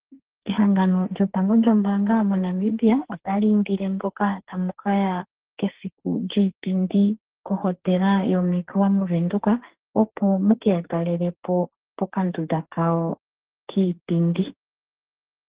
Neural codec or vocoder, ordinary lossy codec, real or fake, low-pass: codec, 44.1 kHz, 2.6 kbps, SNAC; Opus, 16 kbps; fake; 3.6 kHz